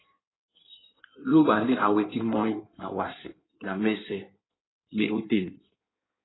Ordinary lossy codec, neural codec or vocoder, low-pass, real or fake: AAC, 16 kbps; codec, 16 kHz in and 24 kHz out, 1.1 kbps, FireRedTTS-2 codec; 7.2 kHz; fake